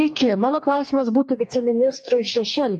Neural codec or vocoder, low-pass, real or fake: codec, 44.1 kHz, 2.6 kbps, DAC; 10.8 kHz; fake